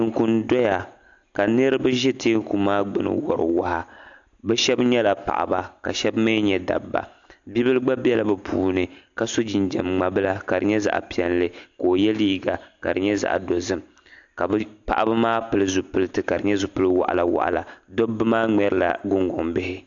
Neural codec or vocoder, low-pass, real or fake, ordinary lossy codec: none; 7.2 kHz; real; AAC, 64 kbps